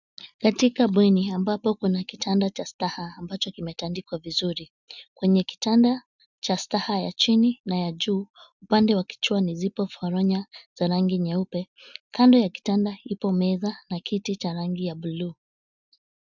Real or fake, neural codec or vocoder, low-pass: real; none; 7.2 kHz